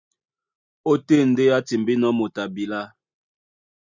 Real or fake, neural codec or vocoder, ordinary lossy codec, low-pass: real; none; Opus, 64 kbps; 7.2 kHz